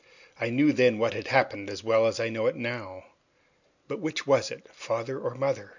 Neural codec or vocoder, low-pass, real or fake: none; 7.2 kHz; real